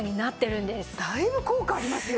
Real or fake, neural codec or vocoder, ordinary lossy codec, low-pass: real; none; none; none